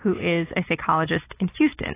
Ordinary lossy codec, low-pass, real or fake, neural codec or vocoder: AAC, 16 kbps; 3.6 kHz; real; none